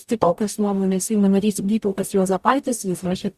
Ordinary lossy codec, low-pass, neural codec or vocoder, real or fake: Opus, 64 kbps; 14.4 kHz; codec, 44.1 kHz, 0.9 kbps, DAC; fake